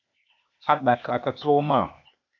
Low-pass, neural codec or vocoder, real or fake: 7.2 kHz; codec, 16 kHz, 0.8 kbps, ZipCodec; fake